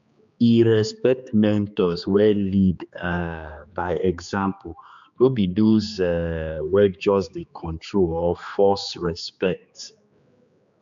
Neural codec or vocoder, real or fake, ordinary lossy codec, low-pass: codec, 16 kHz, 2 kbps, X-Codec, HuBERT features, trained on general audio; fake; MP3, 64 kbps; 7.2 kHz